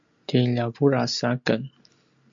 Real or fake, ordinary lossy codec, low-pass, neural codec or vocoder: real; MP3, 64 kbps; 7.2 kHz; none